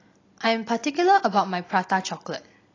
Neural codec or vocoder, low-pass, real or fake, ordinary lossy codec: none; 7.2 kHz; real; AAC, 32 kbps